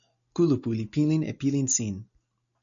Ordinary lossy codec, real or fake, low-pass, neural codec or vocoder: MP3, 96 kbps; real; 7.2 kHz; none